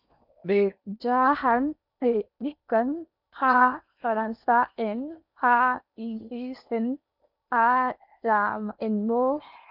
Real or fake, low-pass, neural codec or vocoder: fake; 5.4 kHz; codec, 16 kHz in and 24 kHz out, 0.8 kbps, FocalCodec, streaming, 65536 codes